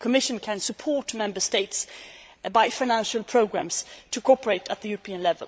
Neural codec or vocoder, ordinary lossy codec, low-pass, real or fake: codec, 16 kHz, 16 kbps, FreqCodec, larger model; none; none; fake